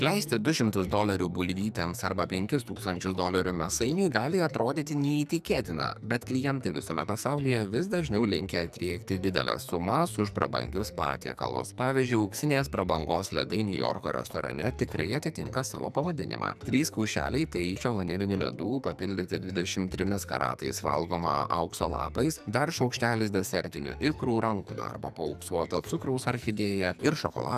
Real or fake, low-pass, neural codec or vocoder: fake; 14.4 kHz; codec, 44.1 kHz, 2.6 kbps, SNAC